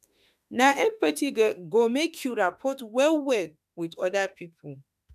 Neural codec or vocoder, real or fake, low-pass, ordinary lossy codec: autoencoder, 48 kHz, 32 numbers a frame, DAC-VAE, trained on Japanese speech; fake; 14.4 kHz; none